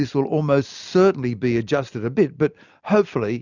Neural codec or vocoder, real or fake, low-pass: none; real; 7.2 kHz